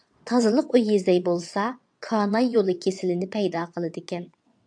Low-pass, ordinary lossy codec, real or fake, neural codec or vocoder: 9.9 kHz; AAC, 64 kbps; fake; vocoder, 22.05 kHz, 80 mel bands, WaveNeXt